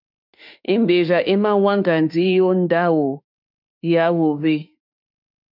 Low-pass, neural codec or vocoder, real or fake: 5.4 kHz; autoencoder, 48 kHz, 32 numbers a frame, DAC-VAE, trained on Japanese speech; fake